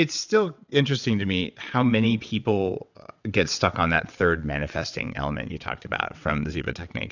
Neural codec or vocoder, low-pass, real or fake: vocoder, 22.05 kHz, 80 mel bands, WaveNeXt; 7.2 kHz; fake